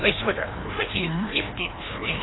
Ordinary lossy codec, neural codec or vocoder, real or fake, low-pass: AAC, 16 kbps; codec, 16 kHz, 1 kbps, FreqCodec, larger model; fake; 7.2 kHz